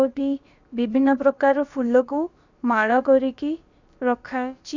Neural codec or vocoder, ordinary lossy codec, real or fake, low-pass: codec, 16 kHz, about 1 kbps, DyCAST, with the encoder's durations; Opus, 64 kbps; fake; 7.2 kHz